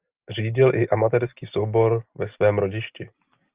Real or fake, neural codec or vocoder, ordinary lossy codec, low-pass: real; none; Opus, 32 kbps; 3.6 kHz